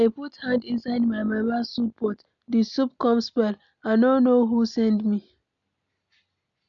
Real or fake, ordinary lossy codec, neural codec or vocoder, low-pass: real; none; none; 7.2 kHz